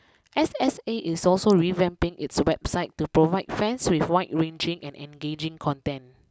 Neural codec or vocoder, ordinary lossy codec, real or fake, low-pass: none; none; real; none